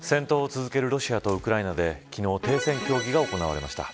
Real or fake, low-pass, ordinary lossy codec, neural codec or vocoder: real; none; none; none